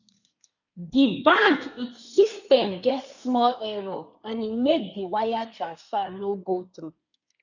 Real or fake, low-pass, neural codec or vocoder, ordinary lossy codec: fake; 7.2 kHz; codec, 24 kHz, 1 kbps, SNAC; none